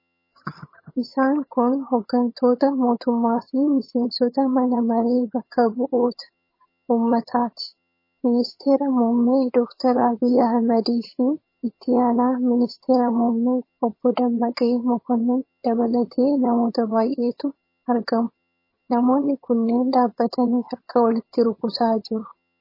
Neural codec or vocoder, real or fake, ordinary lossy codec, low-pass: vocoder, 22.05 kHz, 80 mel bands, HiFi-GAN; fake; MP3, 24 kbps; 5.4 kHz